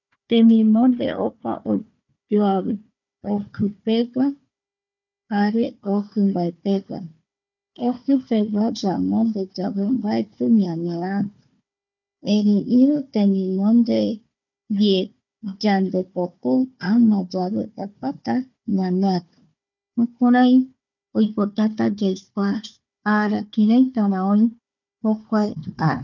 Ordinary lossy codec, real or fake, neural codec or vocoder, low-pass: none; fake; codec, 16 kHz, 4 kbps, FunCodec, trained on Chinese and English, 50 frames a second; 7.2 kHz